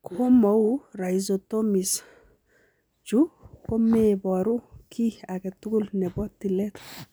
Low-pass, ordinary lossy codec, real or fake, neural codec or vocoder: none; none; real; none